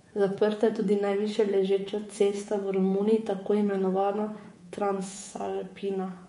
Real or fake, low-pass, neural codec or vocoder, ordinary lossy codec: fake; 10.8 kHz; codec, 24 kHz, 3.1 kbps, DualCodec; MP3, 48 kbps